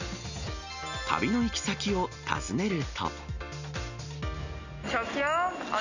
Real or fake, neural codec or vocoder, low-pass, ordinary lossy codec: real; none; 7.2 kHz; none